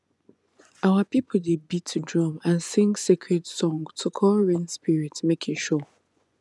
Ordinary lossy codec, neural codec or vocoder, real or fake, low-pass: none; vocoder, 24 kHz, 100 mel bands, Vocos; fake; none